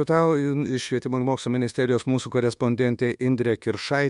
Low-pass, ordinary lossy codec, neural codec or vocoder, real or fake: 10.8 kHz; MP3, 64 kbps; codec, 24 kHz, 1.2 kbps, DualCodec; fake